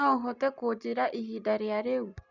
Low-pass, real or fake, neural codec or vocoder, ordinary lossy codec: 7.2 kHz; real; none; none